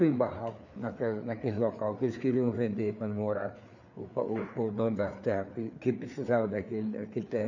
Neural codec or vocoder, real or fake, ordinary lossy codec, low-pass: codec, 16 kHz, 4 kbps, FreqCodec, larger model; fake; none; 7.2 kHz